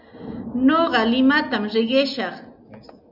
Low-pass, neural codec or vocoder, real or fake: 5.4 kHz; none; real